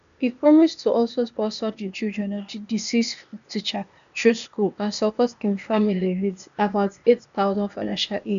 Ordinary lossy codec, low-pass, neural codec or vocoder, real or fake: none; 7.2 kHz; codec, 16 kHz, 0.8 kbps, ZipCodec; fake